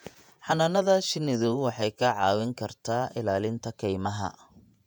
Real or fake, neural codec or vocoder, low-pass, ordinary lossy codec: fake; vocoder, 48 kHz, 128 mel bands, Vocos; 19.8 kHz; none